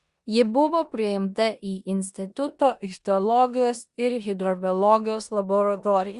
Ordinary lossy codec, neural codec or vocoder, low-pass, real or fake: Opus, 64 kbps; codec, 16 kHz in and 24 kHz out, 0.9 kbps, LongCat-Audio-Codec, four codebook decoder; 10.8 kHz; fake